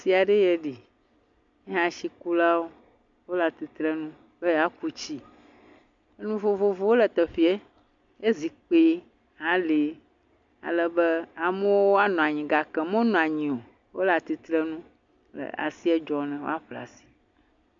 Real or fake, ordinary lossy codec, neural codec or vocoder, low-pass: real; MP3, 64 kbps; none; 7.2 kHz